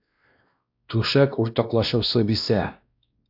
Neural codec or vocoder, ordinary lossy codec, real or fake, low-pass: codec, 16 kHz, 1 kbps, X-Codec, WavLM features, trained on Multilingual LibriSpeech; AAC, 48 kbps; fake; 5.4 kHz